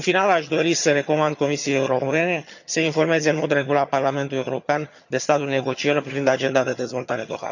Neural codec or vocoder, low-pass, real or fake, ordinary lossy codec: vocoder, 22.05 kHz, 80 mel bands, HiFi-GAN; 7.2 kHz; fake; none